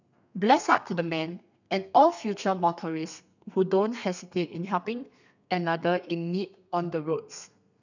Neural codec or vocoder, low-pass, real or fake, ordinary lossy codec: codec, 32 kHz, 1.9 kbps, SNAC; 7.2 kHz; fake; none